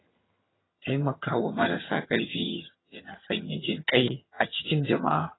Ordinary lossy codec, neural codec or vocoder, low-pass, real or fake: AAC, 16 kbps; vocoder, 22.05 kHz, 80 mel bands, HiFi-GAN; 7.2 kHz; fake